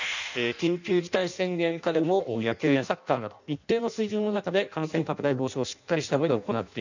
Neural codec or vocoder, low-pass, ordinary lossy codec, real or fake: codec, 16 kHz in and 24 kHz out, 0.6 kbps, FireRedTTS-2 codec; 7.2 kHz; none; fake